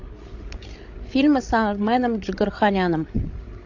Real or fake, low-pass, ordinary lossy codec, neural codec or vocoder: fake; 7.2 kHz; AAC, 48 kbps; codec, 16 kHz, 16 kbps, FreqCodec, larger model